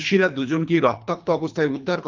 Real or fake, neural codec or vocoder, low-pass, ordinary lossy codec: fake; codec, 24 kHz, 3 kbps, HILCodec; 7.2 kHz; Opus, 32 kbps